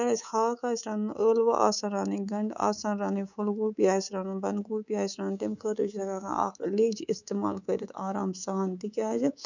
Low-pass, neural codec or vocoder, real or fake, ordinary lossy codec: 7.2 kHz; codec, 24 kHz, 3.1 kbps, DualCodec; fake; none